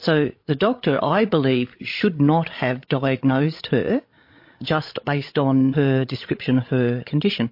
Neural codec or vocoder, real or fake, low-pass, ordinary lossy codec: codec, 16 kHz, 16 kbps, FreqCodec, larger model; fake; 5.4 kHz; MP3, 32 kbps